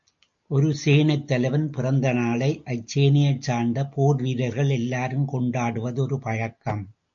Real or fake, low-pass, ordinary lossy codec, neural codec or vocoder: real; 7.2 kHz; AAC, 64 kbps; none